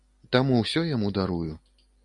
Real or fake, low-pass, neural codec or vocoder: real; 10.8 kHz; none